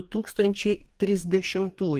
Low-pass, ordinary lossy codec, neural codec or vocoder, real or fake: 14.4 kHz; Opus, 24 kbps; codec, 44.1 kHz, 2.6 kbps, DAC; fake